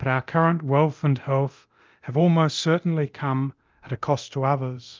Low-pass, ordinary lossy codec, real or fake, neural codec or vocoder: 7.2 kHz; Opus, 24 kbps; fake; codec, 24 kHz, 0.9 kbps, DualCodec